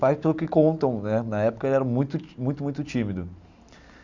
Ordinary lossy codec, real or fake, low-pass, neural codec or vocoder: Opus, 64 kbps; real; 7.2 kHz; none